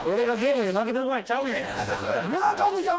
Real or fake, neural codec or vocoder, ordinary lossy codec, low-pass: fake; codec, 16 kHz, 1 kbps, FreqCodec, smaller model; none; none